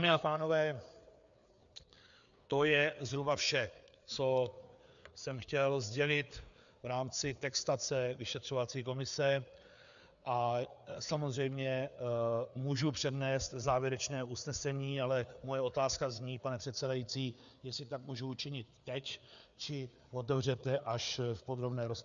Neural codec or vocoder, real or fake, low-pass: codec, 16 kHz, 4 kbps, FreqCodec, larger model; fake; 7.2 kHz